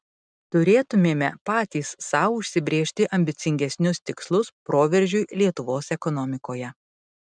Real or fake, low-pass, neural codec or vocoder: real; 9.9 kHz; none